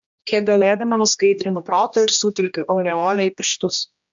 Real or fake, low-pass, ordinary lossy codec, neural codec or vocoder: fake; 7.2 kHz; MP3, 64 kbps; codec, 16 kHz, 1 kbps, X-Codec, HuBERT features, trained on general audio